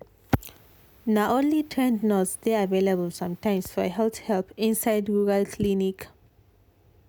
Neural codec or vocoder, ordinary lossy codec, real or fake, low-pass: none; none; real; none